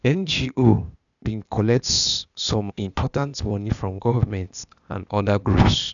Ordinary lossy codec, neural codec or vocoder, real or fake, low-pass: none; codec, 16 kHz, 0.8 kbps, ZipCodec; fake; 7.2 kHz